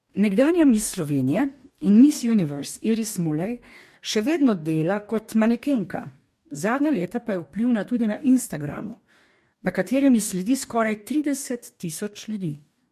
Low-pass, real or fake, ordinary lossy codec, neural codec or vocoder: 14.4 kHz; fake; MP3, 64 kbps; codec, 44.1 kHz, 2.6 kbps, DAC